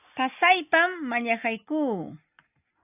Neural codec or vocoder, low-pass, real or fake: none; 3.6 kHz; real